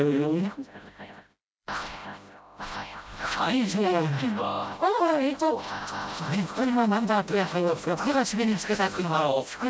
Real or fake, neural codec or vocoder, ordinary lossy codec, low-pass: fake; codec, 16 kHz, 0.5 kbps, FreqCodec, smaller model; none; none